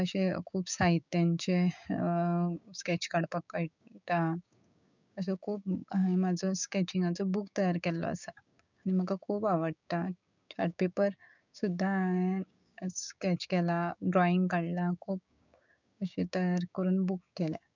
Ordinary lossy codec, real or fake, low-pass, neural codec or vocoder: none; fake; 7.2 kHz; codec, 16 kHz, 6 kbps, DAC